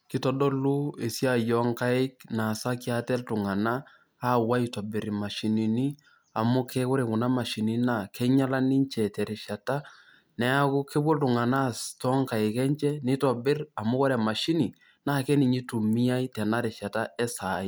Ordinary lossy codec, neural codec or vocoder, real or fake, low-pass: none; none; real; none